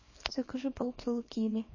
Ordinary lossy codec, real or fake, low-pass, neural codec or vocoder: MP3, 32 kbps; fake; 7.2 kHz; codec, 24 kHz, 0.9 kbps, WavTokenizer, small release